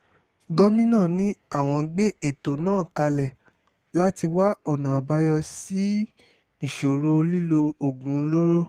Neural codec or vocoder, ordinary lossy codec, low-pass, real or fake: codec, 32 kHz, 1.9 kbps, SNAC; Opus, 32 kbps; 14.4 kHz; fake